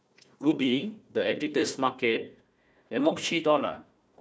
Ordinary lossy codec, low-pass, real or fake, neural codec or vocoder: none; none; fake; codec, 16 kHz, 1 kbps, FunCodec, trained on Chinese and English, 50 frames a second